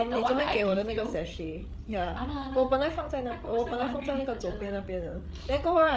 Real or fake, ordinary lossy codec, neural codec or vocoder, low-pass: fake; none; codec, 16 kHz, 8 kbps, FreqCodec, larger model; none